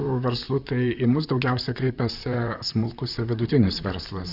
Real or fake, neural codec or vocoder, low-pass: fake; vocoder, 44.1 kHz, 128 mel bands, Pupu-Vocoder; 5.4 kHz